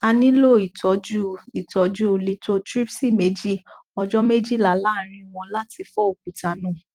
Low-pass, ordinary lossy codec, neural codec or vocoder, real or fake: 19.8 kHz; Opus, 24 kbps; vocoder, 44.1 kHz, 128 mel bands every 256 samples, BigVGAN v2; fake